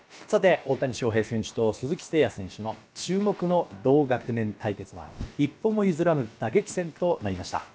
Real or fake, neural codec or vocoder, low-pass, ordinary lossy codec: fake; codec, 16 kHz, about 1 kbps, DyCAST, with the encoder's durations; none; none